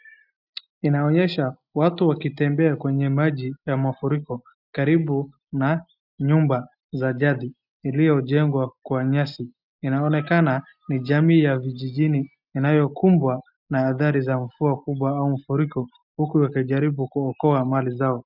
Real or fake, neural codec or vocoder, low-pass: real; none; 5.4 kHz